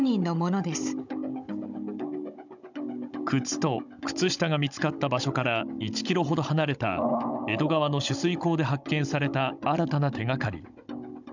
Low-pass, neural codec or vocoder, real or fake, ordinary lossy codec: 7.2 kHz; codec, 16 kHz, 16 kbps, FunCodec, trained on Chinese and English, 50 frames a second; fake; none